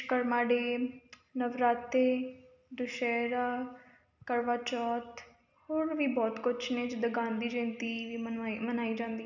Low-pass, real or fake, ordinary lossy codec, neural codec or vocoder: 7.2 kHz; real; none; none